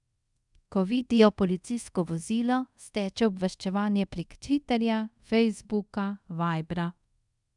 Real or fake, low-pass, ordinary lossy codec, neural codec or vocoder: fake; 10.8 kHz; none; codec, 24 kHz, 0.5 kbps, DualCodec